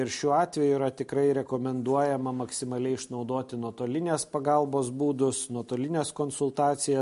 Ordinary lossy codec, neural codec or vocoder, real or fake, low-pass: MP3, 48 kbps; none; real; 10.8 kHz